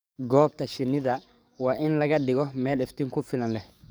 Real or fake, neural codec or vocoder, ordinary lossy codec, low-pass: fake; codec, 44.1 kHz, 7.8 kbps, DAC; none; none